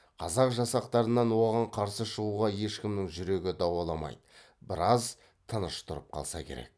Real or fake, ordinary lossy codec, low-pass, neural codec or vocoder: real; none; none; none